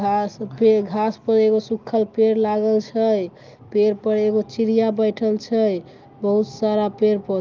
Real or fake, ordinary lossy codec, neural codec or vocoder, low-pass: real; Opus, 32 kbps; none; 7.2 kHz